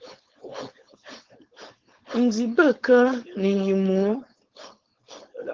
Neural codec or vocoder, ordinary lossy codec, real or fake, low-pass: codec, 16 kHz, 4.8 kbps, FACodec; Opus, 16 kbps; fake; 7.2 kHz